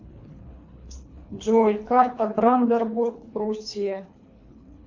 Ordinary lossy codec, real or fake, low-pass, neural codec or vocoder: AAC, 48 kbps; fake; 7.2 kHz; codec, 24 kHz, 3 kbps, HILCodec